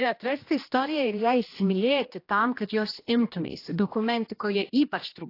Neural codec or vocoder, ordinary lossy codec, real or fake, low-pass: codec, 16 kHz, 1 kbps, X-Codec, HuBERT features, trained on general audio; AAC, 32 kbps; fake; 5.4 kHz